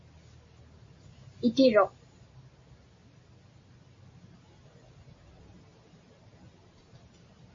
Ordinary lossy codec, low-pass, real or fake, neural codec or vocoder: MP3, 32 kbps; 7.2 kHz; real; none